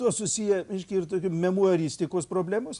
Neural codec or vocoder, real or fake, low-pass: none; real; 10.8 kHz